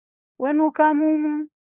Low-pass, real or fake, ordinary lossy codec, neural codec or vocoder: 3.6 kHz; fake; Opus, 64 kbps; codec, 16 kHz, 4 kbps, FunCodec, trained on LibriTTS, 50 frames a second